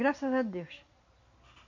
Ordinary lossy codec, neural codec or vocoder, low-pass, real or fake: MP3, 48 kbps; none; 7.2 kHz; real